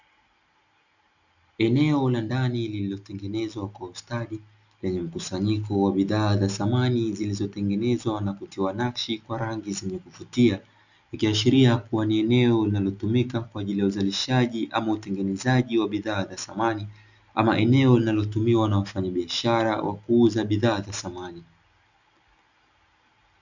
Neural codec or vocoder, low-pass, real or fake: none; 7.2 kHz; real